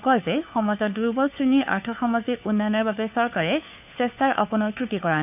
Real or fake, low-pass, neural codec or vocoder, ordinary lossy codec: fake; 3.6 kHz; codec, 16 kHz, 4 kbps, FunCodec, trained on LibriTTS, 50 frames a second; none